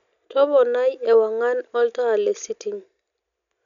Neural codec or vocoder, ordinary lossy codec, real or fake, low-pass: none; none; real; 7.2 kHz